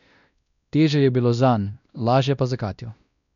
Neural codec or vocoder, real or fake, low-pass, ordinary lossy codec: codec, 16 kHz, 1 kbps, X-Codec, WavLM features, trained on Multilingual LibriSpeech; fake; 7.2 kHz; none